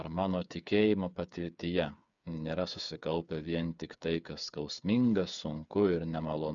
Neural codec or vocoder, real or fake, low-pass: codec, 16 kHz, 8 kbps, FreqCodec, smaller model; fake; 7.2 kHz